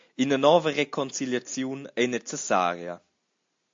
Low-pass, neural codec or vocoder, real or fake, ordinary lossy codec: 7.2 kHz; none; real; MP3, 48 kbps